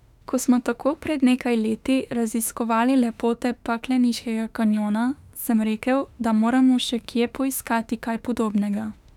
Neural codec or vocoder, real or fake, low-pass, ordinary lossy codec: autoencoder, 48 kHz, 32 numbers a frame, DAC-VAE, trained on Japanese speech; fake; 19.8 kHz; none